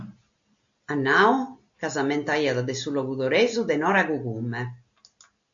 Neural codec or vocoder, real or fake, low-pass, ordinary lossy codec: none; real; 7.2 kHz; AAC, 64 kbps